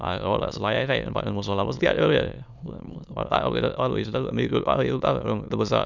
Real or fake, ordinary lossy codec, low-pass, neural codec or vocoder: fake; none; 7.2 kHz; autoencoder, 22.05 kHz, a latent of 192 numbers a frame, VITS, trained on many speakers